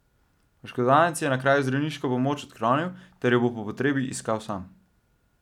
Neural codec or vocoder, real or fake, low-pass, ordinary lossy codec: none; real; 19.8 kHz; none